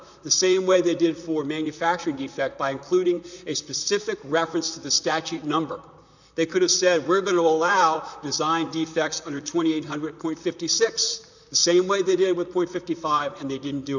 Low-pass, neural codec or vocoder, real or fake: 7.2 kHz; vocoder, 44.1 kHz, 128 mel bands, Pupu-Vocoder; fake